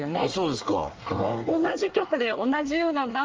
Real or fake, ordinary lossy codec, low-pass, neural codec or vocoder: fake; Opus, 24 kbps; 7.2 kHz; codec, 24 kHz, 1 kbps, SNAC